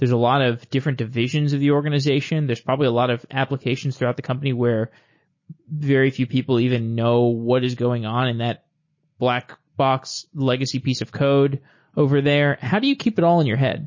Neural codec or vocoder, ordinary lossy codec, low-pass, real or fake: none; MP3, 32 kbps; 7.2 kHz; real